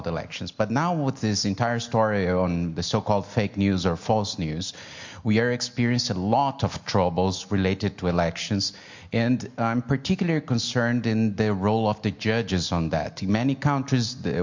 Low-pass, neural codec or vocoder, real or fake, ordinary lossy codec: 7.2 kHz; none; real; MP3, 48 kbps